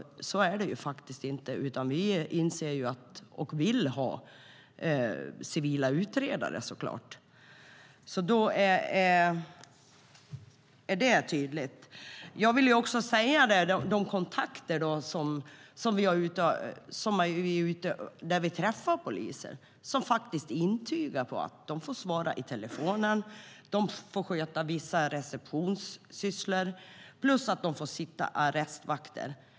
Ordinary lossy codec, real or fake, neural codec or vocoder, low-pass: none; real; none; none